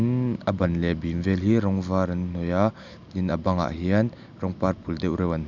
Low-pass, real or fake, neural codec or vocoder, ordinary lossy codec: 7.2 kHz; real; none; none